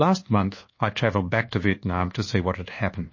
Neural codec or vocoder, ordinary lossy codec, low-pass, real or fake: codec, 24 kHz, 1.2 kbps, DualCodec; MP3, 32 kbps; 7.2 kHz; fake